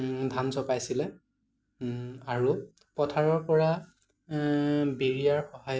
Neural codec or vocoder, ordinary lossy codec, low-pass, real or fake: none; none; none; real